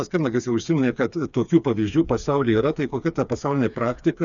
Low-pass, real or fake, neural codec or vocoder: 7.2 kHz; fake; codec, 16 kHz, 4 kbps, FreqCodec, smaller model